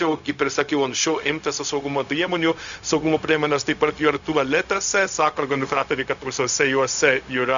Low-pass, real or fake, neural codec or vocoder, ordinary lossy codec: 7.2 kHz; fake; codec, 16 kHz, 0.4 kbps, LongCat-Audio-Codec; MP3, 96 kbps